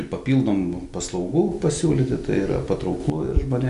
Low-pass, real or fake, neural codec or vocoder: 10.8 kHz; real; none